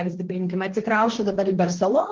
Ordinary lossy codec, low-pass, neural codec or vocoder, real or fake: Opus, 16 kbps; 7.2 kHz; codec, 16 kHz, 1.1 kbps, Voila-Tokenizer; fake